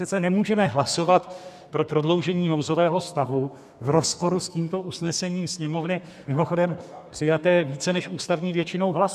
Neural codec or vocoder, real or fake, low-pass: codec, 32 kHz, 1.9 kbps, SNAC; fake; 14.4 kHz